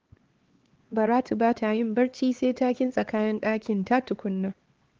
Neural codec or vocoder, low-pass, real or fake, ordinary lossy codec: codec, 16 kHz, 4 kbps, X-Codec, HuBERT features, trained on LibriSpeech; 7.2 kHz; fake; Opus, 16 kbps